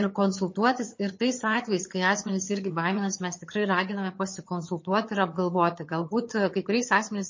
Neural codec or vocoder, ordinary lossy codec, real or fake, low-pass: vocoder, 22.05 kHz, 80 mel bands, HiFi-GAN; MP3, 32 kbps; fake; 7.2 kHz